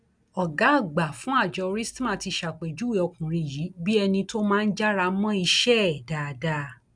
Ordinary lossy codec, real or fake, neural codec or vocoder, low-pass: none; real; none; 9.9 kHz